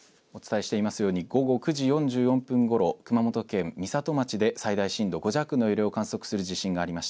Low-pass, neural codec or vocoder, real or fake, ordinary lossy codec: none; none; real; none